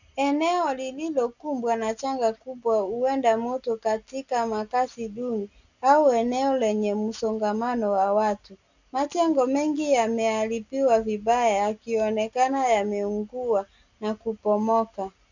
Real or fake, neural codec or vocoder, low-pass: real; none; 7.2 kHz